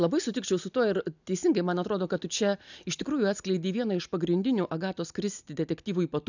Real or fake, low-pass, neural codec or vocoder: real; 7.2 kHz; none